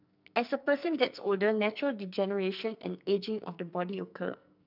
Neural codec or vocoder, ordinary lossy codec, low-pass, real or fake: codec, 44.1 kHz, 2.6 kbps, SNAC; none; 5.4 kHz; fake